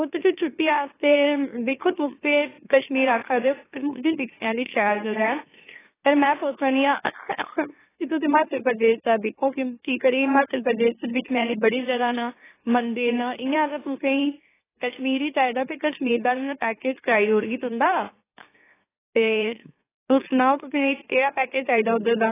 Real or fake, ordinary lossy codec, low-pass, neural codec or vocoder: fake; AAC, 16 kbps; 3.6 kHz; autoencoder, 44.1 kHz, a latent of 192 numbers a frame, MeloTTS